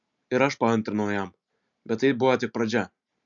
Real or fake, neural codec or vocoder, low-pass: real; none; 7.2 kHz